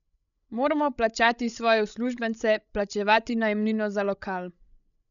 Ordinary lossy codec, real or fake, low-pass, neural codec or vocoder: none; fake; 7.2 kHz; codec, 16 kHz, 16 kbps, FreqCodec, larger model